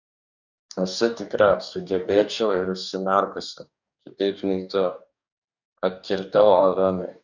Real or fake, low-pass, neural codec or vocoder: fake; 7.2 kHz; codec, 44.1 kHz, 2.6 kbps, DAC